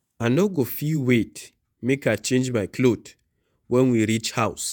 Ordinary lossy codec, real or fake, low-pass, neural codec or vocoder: none; fake; none; vocoder, 48 kHz, 128 mel bands, Vocos